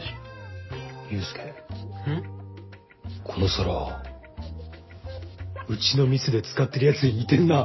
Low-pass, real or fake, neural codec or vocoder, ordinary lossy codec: 7.2 kHz; real; none; MP3, 24 kbps